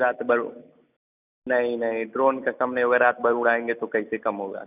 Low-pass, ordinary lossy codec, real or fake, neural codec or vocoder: 3.6 kHz; none; real; none